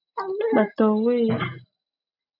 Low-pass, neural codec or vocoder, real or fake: 5.4 kHz; none; real